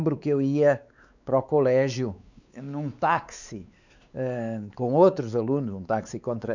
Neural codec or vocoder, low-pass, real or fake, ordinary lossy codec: codec, 16 kHz, 4 kbps, X-Codec, WavLM features, trained on Multilingual LibriSpeech; 7.2 kHz; fake; none